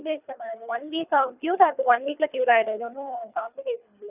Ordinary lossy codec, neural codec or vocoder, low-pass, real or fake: none; codec, 24 kHz, 3 kbps, HILCodec; 3.6 kHz; fake